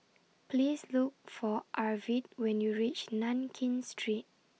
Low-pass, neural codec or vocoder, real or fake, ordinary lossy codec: none; none; real; none